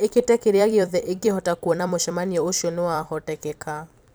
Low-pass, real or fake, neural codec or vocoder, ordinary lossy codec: none; fake; vocoder, 44.1 kHz, 128 mel bands every 256 samples, BigVGAN v2; none